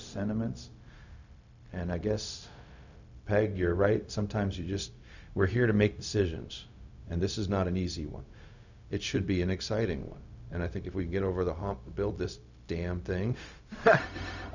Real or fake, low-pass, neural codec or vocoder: fake; 7.2 kHz; codec, 16 kHz, 0.4 kbps, LongCat-Audio-Codec